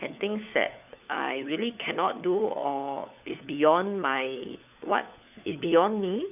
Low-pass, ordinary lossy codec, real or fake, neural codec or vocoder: 3.6 kHz; none; fake; codec, 16 kHz, 4 kbps, FunCodec, trained on LibriTTS, 50 frames a second